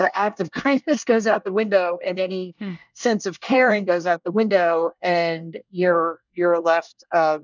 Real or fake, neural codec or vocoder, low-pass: fake; codec, 24 kHz, 1 kbps, SNAC; 7.2 kHz